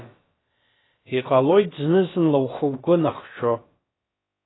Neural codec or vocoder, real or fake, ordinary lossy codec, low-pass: codec, 16 kHz, about 1 kbps, DyCAST, with the encoder's durations; fake; AAC, 16 kbps; 7.2 kHz